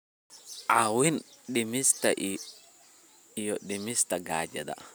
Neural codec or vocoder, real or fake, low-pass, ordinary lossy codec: none; real; none; none